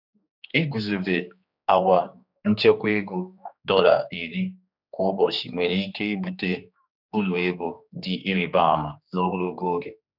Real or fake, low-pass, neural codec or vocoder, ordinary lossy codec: fake; 5.4 kHz; codec, 16 kHz, 2 kbps, X-Codec, HuBERT features, trained on general audio; none